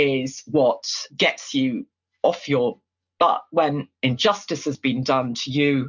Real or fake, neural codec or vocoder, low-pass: real; none; 7.2 kHz